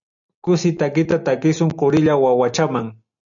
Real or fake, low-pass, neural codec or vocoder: real; 7.2 kHz; none